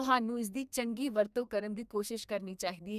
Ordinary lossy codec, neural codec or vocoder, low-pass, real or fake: none; codec, 32 kHz, 1.9 kbps, SNAC; 14.4 kHz; fake